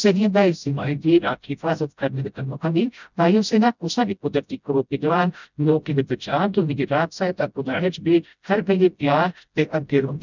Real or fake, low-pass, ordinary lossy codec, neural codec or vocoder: fake; 7.2 kHz; none; codec, 16 kHz, 0.5 kbps, FreqCodec, smaller model